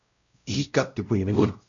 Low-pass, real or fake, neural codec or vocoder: 7.2 kHz; fake; codec, 16 kHz, 0.5 kbps, X-Codec, WavLM features, trained on Multilingual LibriSpeech